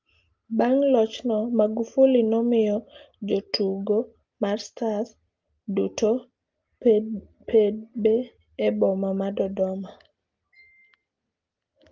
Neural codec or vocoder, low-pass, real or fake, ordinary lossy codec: none; 7.2 kHz; real; Opus, 32 kbps